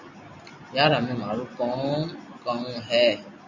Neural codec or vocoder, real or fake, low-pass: none; real; 7.2 kHz